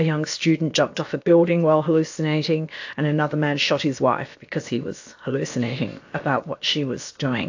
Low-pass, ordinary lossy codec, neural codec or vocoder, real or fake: 7.2 kHz; AAC, 48 kbps; codec, 16 kHz, about 1 kbps, DyCAST, with the encoder's durations; fake